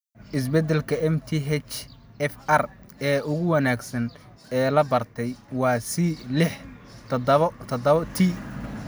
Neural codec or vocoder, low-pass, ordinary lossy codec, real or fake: none; none; none; real